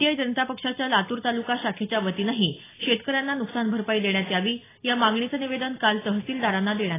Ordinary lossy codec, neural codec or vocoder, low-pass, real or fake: AAC, 16 kbps; none; 3.6 kHz; real